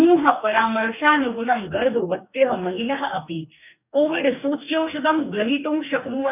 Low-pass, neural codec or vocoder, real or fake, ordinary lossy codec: 3.6 kHz; codec, 44.1 kHz, 2.6 kbps, DAC; fake; none